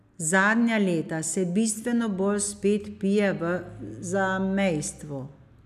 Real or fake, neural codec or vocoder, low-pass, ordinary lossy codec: real; none; 14.4 kHz; none